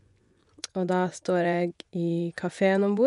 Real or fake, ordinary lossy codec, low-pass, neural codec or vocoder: fake; none; 10.8 kHz; vocoder, 24 kHz, 100 mel bands, Vocos